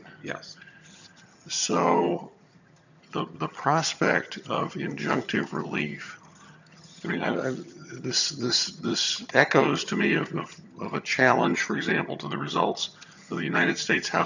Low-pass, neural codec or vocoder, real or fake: 7.2 kHz; vocoder, 22.05 kHz, 80 mel bands, HiFi-GAN; fake